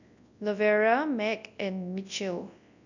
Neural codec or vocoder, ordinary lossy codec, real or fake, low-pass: codec, 24 kHz, 0.9 kbps, WavTokenizer, large speech release; none; fake; 7.2 kHz